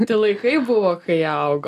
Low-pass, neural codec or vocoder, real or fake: 14.4 kHz; none; real